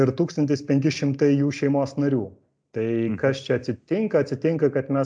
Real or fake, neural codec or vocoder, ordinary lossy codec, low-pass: real; none; MP3, 96 kbps; 9.9 kHz